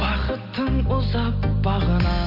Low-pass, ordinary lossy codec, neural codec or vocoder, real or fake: 5.4 kHz; none; none; real